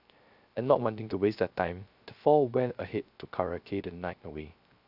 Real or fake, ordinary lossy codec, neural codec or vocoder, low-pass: fake; none; codec, 16 kHz, 0.3 kbps, FocalCodec; 5.4 kHz